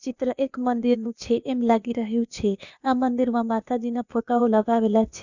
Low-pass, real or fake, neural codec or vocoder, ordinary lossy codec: 7.2 kHz; fake; codec, 16 kHz, 0.8 kbps, ZipCodec; none